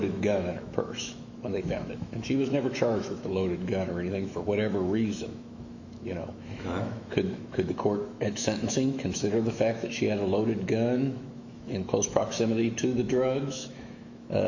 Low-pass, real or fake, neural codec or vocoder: 7.2 kHz; fake; autoencoder, 48 kHz, 128 numbers a frame, DAC-VAE, trained on Japanese speech